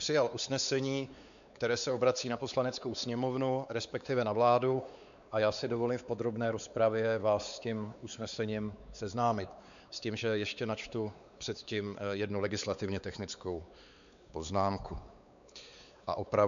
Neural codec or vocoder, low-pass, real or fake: codec, 16 kHz, 4 kbps, X-Codec, WavLM features, trained on Multilingual LibriSpeech; 7.2 kHz; fake